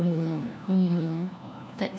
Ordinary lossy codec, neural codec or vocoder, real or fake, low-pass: none; codec, 16 kHz, 1 kbps, FunCodec, trained on LibriTTS, 50 frames a second; fake; none